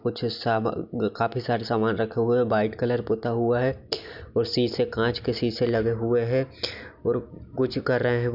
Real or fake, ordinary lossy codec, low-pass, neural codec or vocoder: real; none; 5.4 kHz; none